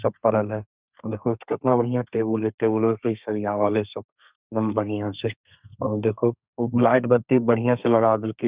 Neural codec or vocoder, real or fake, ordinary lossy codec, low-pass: codec, 32 kHz, 1.9 kbps, SNAC; fake; Opus, 64 kbps; 3.6 kHz